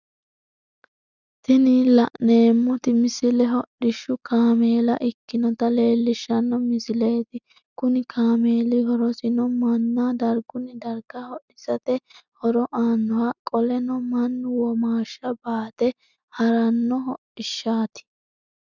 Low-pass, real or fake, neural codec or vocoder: 7.2 kHz; real; none